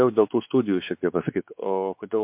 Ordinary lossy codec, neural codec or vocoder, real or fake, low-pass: MP3, 32 kbps; codec, 16 kHz, 4 kbps, X-Codec, WavLM features, trained on Multilingual LibriSpeech; fake; 3.6 kHz